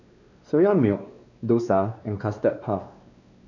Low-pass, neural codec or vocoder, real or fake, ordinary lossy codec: 7.2 kHz; codec, 16 kHz, 2 kbps, X-Codec, WavLM features, trained on Multilingual LibriSpeech; fake; none